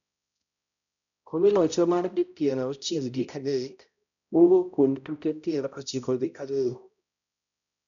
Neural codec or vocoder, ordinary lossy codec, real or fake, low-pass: codec, 16 kHz, 0.5 kbps, X-Codec, HuBERT features, trained on balanced general audio; none; fake; 7.2 kHz